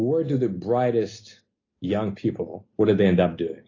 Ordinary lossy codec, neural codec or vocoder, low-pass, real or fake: AAC, 32 kbps; none; 7.2 kHz; real